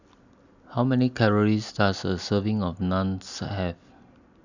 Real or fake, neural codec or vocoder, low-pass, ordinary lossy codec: real; none; 7.2 kHz; none